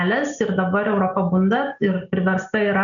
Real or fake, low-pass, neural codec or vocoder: real; 7.2 kHz; none